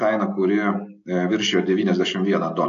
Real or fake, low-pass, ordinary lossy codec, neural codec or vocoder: real; 7.2 kHz; AAC, 48 kbps; none